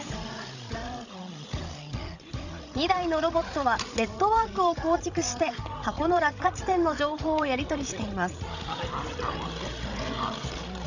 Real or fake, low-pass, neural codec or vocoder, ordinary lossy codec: fake; 7.2 kHz; codec, 16 kHz, 16 kbps, FreqCodec, larger model; none